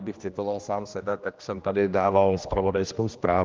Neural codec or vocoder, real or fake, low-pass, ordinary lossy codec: codec, 16 kHz, 1 kbps, X-Codec, HuBERT features, trained on general audio; fake; 7.2 kHz; Opus, 24 kbps